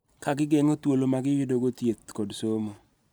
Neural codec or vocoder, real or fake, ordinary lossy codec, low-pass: none; real; none; none